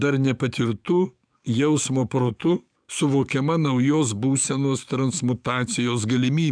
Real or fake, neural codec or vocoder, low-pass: fake; codec, 44.1 kHz, 7.8 kbps, DAC; 9.9 kHz